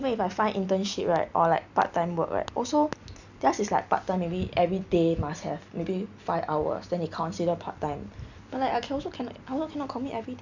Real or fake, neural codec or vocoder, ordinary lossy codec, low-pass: real; none; none; 7.2 kHz